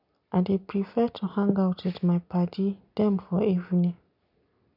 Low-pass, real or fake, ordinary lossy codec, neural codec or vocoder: 5.4 kHz; real; none; none